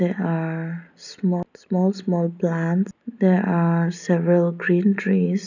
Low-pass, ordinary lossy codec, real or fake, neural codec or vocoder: 7.2 kHz; none; real; none